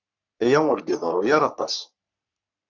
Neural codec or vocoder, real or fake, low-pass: codec, 44.1 kHz, 3.4 kbps, Pupu-Codec; fake; 7.2 kHz